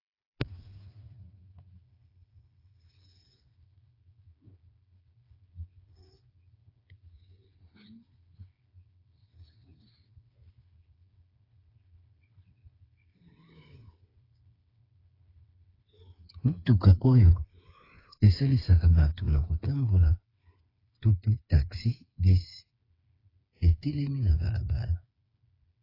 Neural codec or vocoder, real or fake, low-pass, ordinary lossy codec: codec, 16 kHz, 4 kbps, FreqCodec, smaller model; fake; 5.4 kHz; AAC, 24 kbps